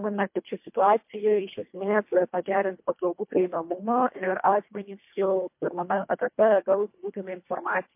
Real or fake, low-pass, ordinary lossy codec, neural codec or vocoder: fake; 3.6 kHz; MP3, 32 kbps; codec, 24 kHz, 1.5 kbps, HILCodec